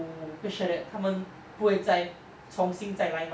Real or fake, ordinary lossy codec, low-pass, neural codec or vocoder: real; none; none; none